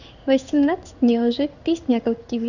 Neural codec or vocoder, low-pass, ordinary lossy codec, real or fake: codec, 16 kHz in and 24 kHz out, 1 kbps, XY-Tokenizer; 7.2 kHz; none; fake